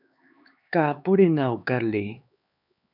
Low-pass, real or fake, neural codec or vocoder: 5.4 kHz; fake; codec, 16 kHz, 4 kbps, X-Codec, HuBERT features, trained on LibriSpeech